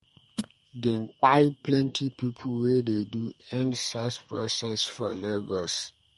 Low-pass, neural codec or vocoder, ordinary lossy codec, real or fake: 14.4 kHz; codec, 32 kHz, 1.9 kbps, SNAC; MP3, 48 kbps; fake